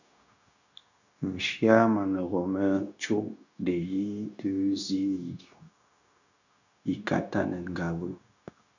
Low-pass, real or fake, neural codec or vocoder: 7.2 kHz; fake; codec, 16 kHz, 0.9 kbps, LongCat-Audio-Codec